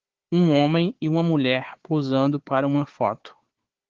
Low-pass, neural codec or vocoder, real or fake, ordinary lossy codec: 7.2 kHz; codec, 16 kHz, 4 kbps, FunCodec, trained on Chinese and English, 50 frames a second; fake; Opus, 16 kbps